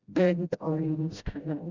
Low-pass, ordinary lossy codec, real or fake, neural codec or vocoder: 7.2 kHz; Opus, 64 kbps; fake; codec, 16 kHz, 0.5 kbps, FreqCodec, smaller model